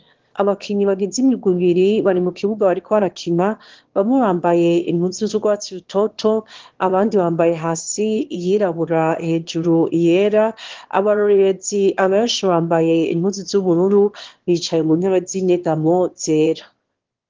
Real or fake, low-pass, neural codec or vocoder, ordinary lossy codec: fake; 7.2 kHz; autoencoder, 22.05 kHz, a latent of 192 numbers a frame, VITS, trained on one speaker; Opus, 16 kbps